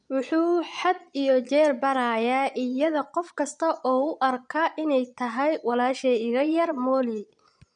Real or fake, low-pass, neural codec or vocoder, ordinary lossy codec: fake; 9.9 kHz; vocoder, 22.05 kHz, 80 mel bands, Vocos; none